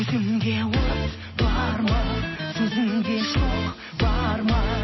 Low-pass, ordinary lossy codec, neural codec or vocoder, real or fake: 7.2 kHz; MP3, 24 kbps; none; real